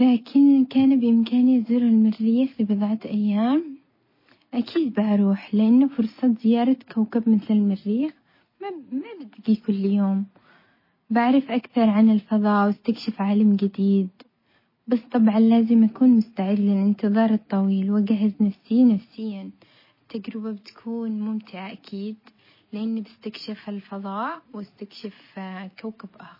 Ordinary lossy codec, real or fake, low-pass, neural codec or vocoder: MP3, 24 kbps; real; 5.4 kHz; none